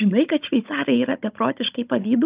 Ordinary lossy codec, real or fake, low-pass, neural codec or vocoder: Opus, 24 kbps; fake; 3.6 kHz; codec, 16 kHz, 16 kbps, FunCodec, trained on Chinese and English, 50 frames a second